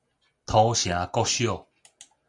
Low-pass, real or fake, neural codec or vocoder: 10.8 kHz; real; none